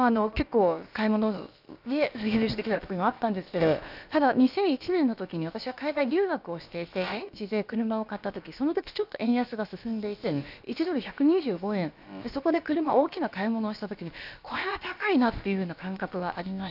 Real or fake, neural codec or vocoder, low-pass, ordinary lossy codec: fake; codec, 16 kHz, about 1 kbps, DyCAST, with the encoder's durations; 5.4 kHz; none